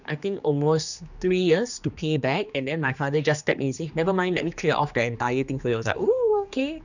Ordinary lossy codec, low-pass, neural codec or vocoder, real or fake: none; 7.2 kHz; codec, 16 kHz, 2 kbps, X-Codec, HuBERT features, trained on general audio; fake